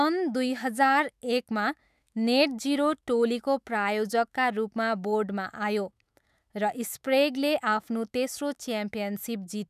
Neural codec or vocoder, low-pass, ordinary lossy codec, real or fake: autoencoder, 48 kHz, 128 numbers a frame, DAC-VAE, trained on Japanese speech; 14.4 kHz; none; fake